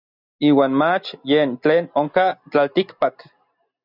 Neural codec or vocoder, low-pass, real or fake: none; 5.4 kHz; real